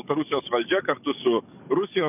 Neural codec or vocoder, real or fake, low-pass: codec, 44.1 kHz, 7.8 kbps, DAC; fake; 3.6 kHz